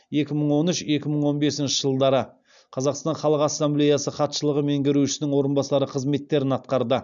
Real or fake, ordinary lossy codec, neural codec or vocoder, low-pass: real; none; none; 7.2 kHz